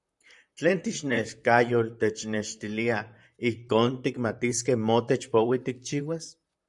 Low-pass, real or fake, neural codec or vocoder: 10.8 kHz; fake; vocoder, 44.1 kHz, 128 mel bands, Pupu-Vocoder